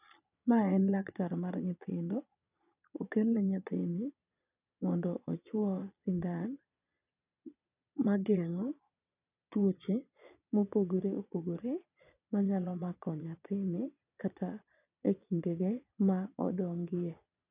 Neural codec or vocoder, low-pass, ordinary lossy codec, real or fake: vocoder, 22.05 kHz, 80 mel bands, Vocos; 3.6 kHz; none; fake